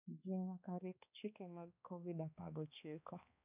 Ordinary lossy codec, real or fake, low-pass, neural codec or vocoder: none; fake; 3.6 kHz; codec, 16 kHz, 2 kbps, X-Codec, HuBERT features, trained on balanced general audio